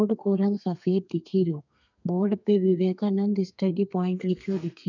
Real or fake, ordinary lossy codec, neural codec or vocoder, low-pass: fake; none; codec, 32 kHz, 1.9 kbps, SNAC; 7.2 kHz